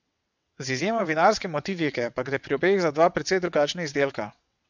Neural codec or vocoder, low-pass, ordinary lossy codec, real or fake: vocoder, 22.05 kHz, 80 mel bands, WaveNeXt; 7.2 kHz; MP3, 64 kbps; fake